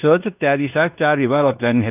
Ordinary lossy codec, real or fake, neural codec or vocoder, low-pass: none; fake; codec, 16 kHz in and 24 kHz out, 0.9 kbps, LongCat-Audio-Codec, fine tuned four codebook decoder; 3.6 kHz